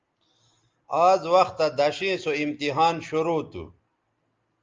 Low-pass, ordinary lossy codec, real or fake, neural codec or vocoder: 7.2 kHz; Opus, 24 kbps; real; none